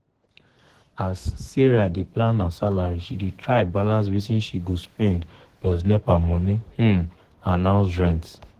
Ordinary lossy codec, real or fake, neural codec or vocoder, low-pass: Opus, 16 kbps; fake; codec, 32 kHz, 1.9 kbps, SNAC; 14.4 kHz